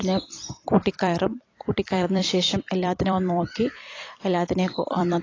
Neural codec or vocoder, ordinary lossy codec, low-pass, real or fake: vocoder, 44.1 kHz, 128 mel bands every 256 samples, BigVGAN v2; AAC, 32 kbps; 7.2 kHz; fake